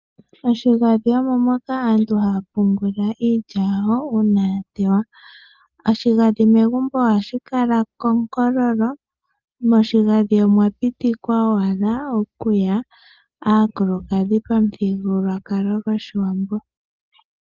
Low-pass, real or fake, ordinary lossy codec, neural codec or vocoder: 7.2 kHz; real; Opus, 32 kbps; none